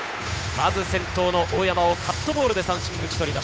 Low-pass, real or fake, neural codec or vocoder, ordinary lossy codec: none; fake; codec, 16 kHz, 8 kbps, FunCodec, trained on Chinese and English, 25 frames a second; none